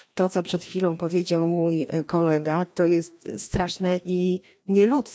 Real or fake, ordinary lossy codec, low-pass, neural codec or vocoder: fake; none; none; codec, 16 kHz, 1 kbps, FreqCodec, larger model